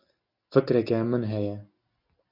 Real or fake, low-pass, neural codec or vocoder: real; 5.4 kHz; none